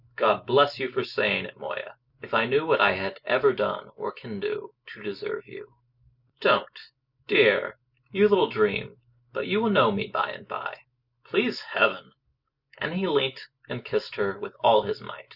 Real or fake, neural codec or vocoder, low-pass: real; none; 5.4 kHz